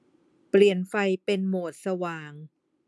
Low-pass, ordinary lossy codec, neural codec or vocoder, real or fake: none; none; none; real